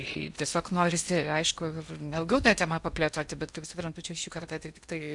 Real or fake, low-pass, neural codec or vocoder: fake; 10.8 kHz; codec, 16 kHz in and 24 kHz out, 0.8 kbps, FocalCodec, streaming, 65536 codes